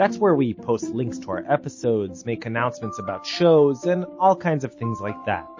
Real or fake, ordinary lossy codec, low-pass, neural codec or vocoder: real; MP3, 32 kbps; 7.2 kHz; none